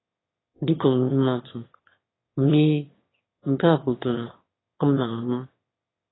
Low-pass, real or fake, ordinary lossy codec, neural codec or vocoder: 7.2 kHz; fake; AAC, 16 kbps; autoencoder, 22.05 kHz, a latent of 192 numbers a frame, VITS, trained on one speaker